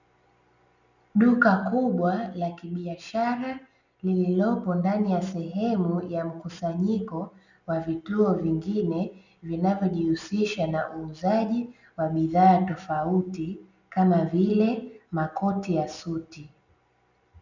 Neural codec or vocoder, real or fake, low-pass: none; real; 7.2 kHz